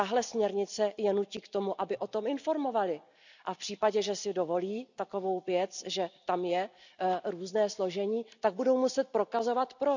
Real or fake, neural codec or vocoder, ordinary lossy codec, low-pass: real; none; none; 7.2 kHz